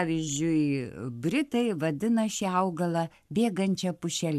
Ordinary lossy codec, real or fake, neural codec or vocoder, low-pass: AAC, 96 kbps; fake; codec, 44.1 kHz, 7.8 kbps, Pupu-Codec; 14.4 kHz